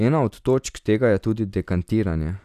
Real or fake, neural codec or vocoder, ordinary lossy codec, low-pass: fake; vocoder, 44.1 kHz, 128 mel bands every 256 samples, BigVGAN v2; none; 14.4 kHz